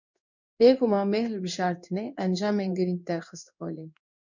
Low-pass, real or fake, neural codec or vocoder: 7.2 kHz; fake; codec, 16 kHz in and 24 kHz out, 1 kbps, XY-Tokenizer